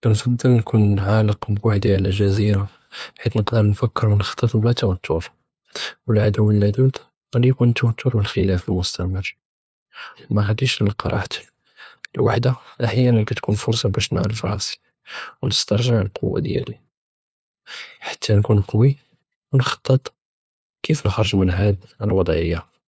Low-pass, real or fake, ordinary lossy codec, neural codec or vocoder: none; fake; none; codec, 16 kHz, 2 kbps, FunCodec, trained on LibriTTS, 25 frames a second